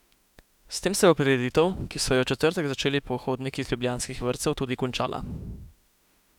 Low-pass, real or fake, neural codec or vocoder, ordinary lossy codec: 19.8 kHz; fake; autoencoder, 48 kHz, 32 numbers a frame, DAC-VAE, trained on Japanese speech; none